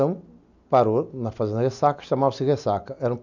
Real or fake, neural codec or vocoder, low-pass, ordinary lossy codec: real; none; 7.2 kHz; none